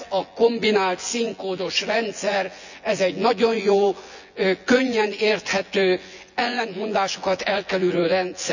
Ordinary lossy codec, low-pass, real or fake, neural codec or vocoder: none; 7.2 kHz; fake; vocoder, 24 kHz, 100 mel bands, Vocos